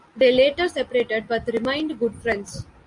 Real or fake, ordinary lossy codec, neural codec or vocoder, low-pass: real; AAC, 64 kbps; none; 10.8 kHz